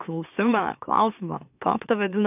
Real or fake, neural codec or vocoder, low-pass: fake; autoencoder, 44.1 kHz, a latent of 192 numbers a frame, MeloTTS; 3.6 kHz